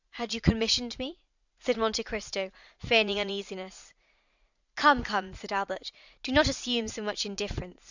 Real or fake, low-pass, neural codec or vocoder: fake; 7.2 kHz; vocoder, 44.1 kHz, 80 mel bands, Vocos